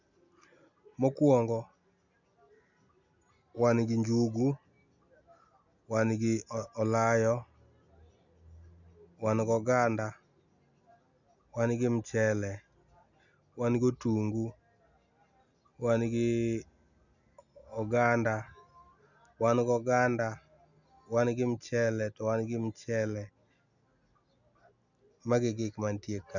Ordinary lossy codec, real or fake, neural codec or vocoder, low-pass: none; real; none; 7.2 kHz